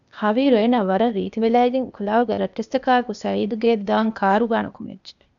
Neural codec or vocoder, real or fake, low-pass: codec, 16 kHz, 0.8 kbps, ZipCodec; fake; 7.2 kHz